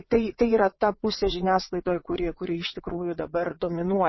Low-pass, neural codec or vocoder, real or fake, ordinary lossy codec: 7.2 kHz; vocoder, 22.05 kHz, 80 mel bands, WaveNeXt; fake; MP3, 24 kbps